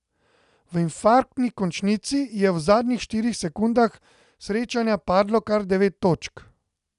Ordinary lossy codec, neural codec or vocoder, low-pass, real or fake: none; none; 10.8 kHz; real